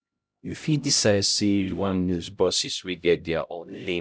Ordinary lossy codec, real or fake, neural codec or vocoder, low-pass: none; fake; codec, 16 kHz, 0.5 kbps, X-Codec, HuBERT features, trained on LibriSpeech; none